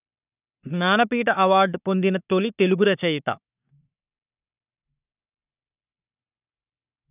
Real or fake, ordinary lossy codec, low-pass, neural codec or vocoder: fake; none; 3.6 kHz; codec, 44.1 kHz, 3.4 kbps, Pupu-Codec